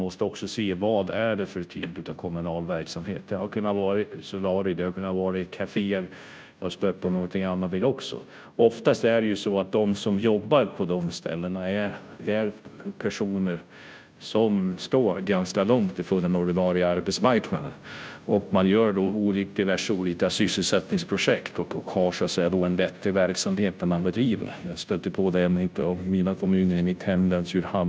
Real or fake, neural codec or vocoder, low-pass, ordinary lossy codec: fake; codec, 16 kHz, 0.5 kbps, FunCodec, trained on Chinese and English, 25 frames a second; none; none